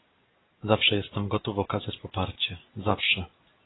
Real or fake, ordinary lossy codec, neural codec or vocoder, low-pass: real; AAC, 16 kbps; none; 7.2 kHz